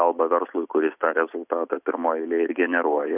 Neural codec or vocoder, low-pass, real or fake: none; 3.6 kHz; real